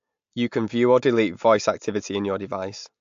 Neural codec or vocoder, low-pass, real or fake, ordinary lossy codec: none; 7.2 kHz; real; AAC, 64 kbps